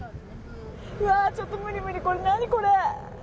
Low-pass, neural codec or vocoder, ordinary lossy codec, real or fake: none; none; none; real